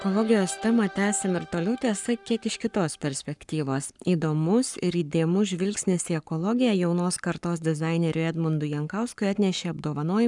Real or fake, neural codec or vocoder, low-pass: fake; codec, 44.1 kHz, 7.8 kbps, Pupu-Codec; 10.8 kHz